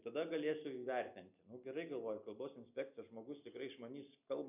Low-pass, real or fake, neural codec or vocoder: 3.6 kHz; real; none